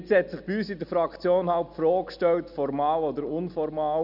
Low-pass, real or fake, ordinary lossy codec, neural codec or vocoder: 5.4 kHz; real; none; none